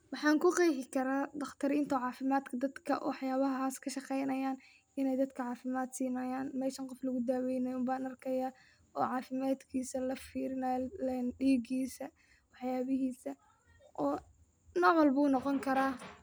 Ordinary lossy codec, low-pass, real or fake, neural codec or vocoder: none; none; real; none